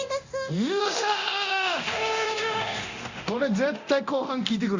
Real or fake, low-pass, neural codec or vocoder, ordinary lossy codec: fake; 7.2 kHz; codec, 24 kHz, 0.9 kbps, DualCodec; Opus, 64 kbps